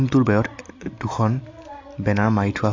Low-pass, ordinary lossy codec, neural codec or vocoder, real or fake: 7.2 kHz; AAC, 48 kbps; none; real